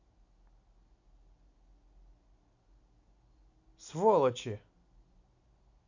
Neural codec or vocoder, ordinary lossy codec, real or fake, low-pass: none; none; real; 7.2 kHz